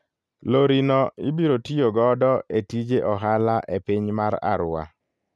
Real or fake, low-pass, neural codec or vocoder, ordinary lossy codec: real; none; none; none